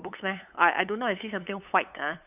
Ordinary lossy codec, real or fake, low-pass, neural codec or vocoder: none; fake; 3.6 kHz; codec, 16 kHz, 8 kbps, FunCodec, trained on LibriTTS, 25 frames a second